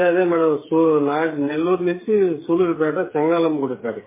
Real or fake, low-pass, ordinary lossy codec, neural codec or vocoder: fake; 3.6 kHz; MP3, 16 kbps; codec, 16 kHz, 8 kbps, FreqCodec, smaller model